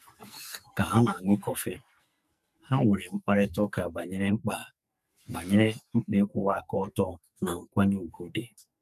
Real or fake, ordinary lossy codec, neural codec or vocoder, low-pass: fake; none; codec, 44.1 kHz, 2.6 kbps, SNAC; 14.4 kHz